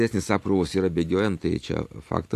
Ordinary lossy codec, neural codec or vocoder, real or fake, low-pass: AAC, 64 kbps; none; real; 14.4 kHz